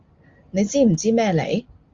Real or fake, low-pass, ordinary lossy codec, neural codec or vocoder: real; 7.2 kHz; Opus, 32 kbps; none